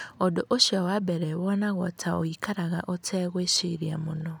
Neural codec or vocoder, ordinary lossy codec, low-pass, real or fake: none; none; none; real